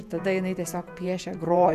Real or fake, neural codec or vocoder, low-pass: fake; vocoder, 48 kHz, 128 mel bands, Vocos; 14.4 kHz